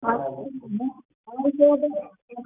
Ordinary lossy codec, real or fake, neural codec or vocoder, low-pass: none; real; none; 3.6 kHz